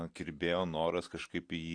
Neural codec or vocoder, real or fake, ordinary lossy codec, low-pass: none; real; AAC, 48 kbps; 9.9 kHz